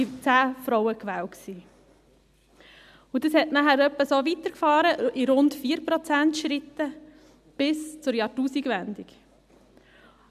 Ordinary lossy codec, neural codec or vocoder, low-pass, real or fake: none; none; 14.4 kHz; real